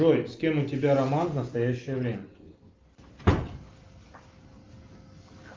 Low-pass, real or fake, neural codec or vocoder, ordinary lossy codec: 7.2 kHz; real; none; Opus, 24 kbps